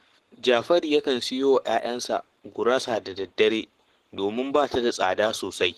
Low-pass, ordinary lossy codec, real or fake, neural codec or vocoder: 14.4 kHz; Opus, 16 kbps; fake; codec, 44.1 kHz, 7.8 kbps, Pupu-Codec